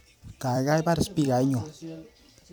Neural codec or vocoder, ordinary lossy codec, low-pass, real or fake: vocoder, 44.1 kHz, 128 mel bands every 256 samples, BigVGAN v2; none; none; fake